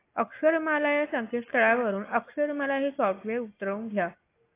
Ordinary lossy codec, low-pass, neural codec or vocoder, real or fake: AAC, 24 kbps; 3.6 kHz; none; real